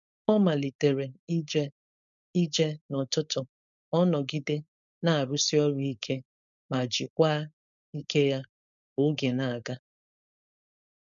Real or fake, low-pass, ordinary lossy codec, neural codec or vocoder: fake; 7.2 kHz; MP3, 96 kbps; codec, 16 kHz, 4.8 kbps, FACodec